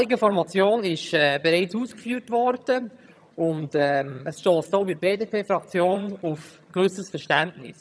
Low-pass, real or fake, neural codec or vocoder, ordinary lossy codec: none; fake; vocoder, 22.05 kHz, 80 mel bands, HiFi-GAN; none